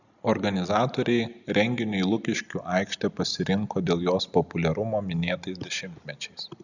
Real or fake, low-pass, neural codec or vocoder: real; 7.2 kHz; none